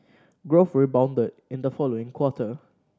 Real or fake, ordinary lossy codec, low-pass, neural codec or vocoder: real; none; none; none